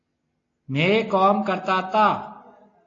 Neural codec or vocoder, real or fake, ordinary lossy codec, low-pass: none; real; AAC, 32 kbps; 7.2 kHz